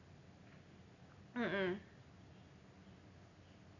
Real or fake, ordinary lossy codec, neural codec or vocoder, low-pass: real; none; none; 7.2 kHz